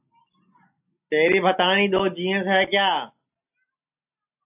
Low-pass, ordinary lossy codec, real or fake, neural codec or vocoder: 3.6 kHz; AAC, 32 kbps; real; none